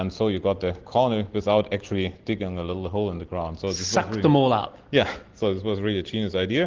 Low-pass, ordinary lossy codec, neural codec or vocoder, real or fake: 7.2 kHz; Opus, 16 kbps; none; real